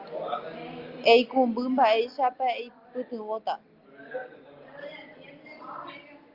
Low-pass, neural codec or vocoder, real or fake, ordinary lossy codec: 5.4 kHz; none; real; Opus, 32 kbps